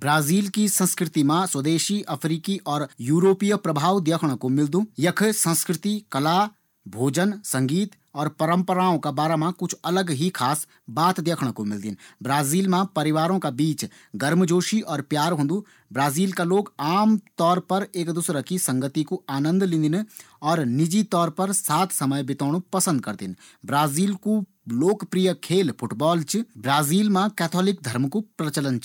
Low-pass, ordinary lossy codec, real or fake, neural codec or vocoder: 19.8 kHz; none; real; none